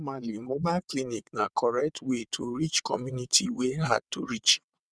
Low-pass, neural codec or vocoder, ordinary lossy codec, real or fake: none; vocoder, 22.05 kHz, 80 mel bands, WaveNeXt; none; fake